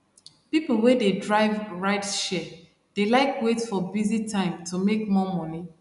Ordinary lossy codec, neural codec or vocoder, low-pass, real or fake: none; none; 10.8 kHz; real